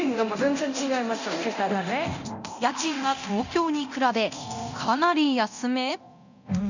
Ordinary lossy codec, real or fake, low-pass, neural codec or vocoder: none; fake; 7.2 kHz; codec, 24 kHz, 0.9 kbps, DualCodec